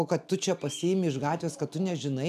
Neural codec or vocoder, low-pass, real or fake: none; 14.4 kHz; real